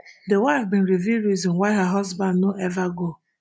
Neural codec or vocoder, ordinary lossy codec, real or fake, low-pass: none; none; real; none